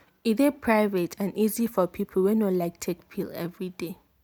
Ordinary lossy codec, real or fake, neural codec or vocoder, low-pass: none; real; none; none